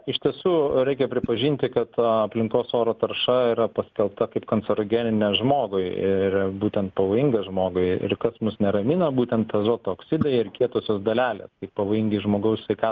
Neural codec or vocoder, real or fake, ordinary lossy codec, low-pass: none; real; Opus, 24 kbps; 7.2 kHz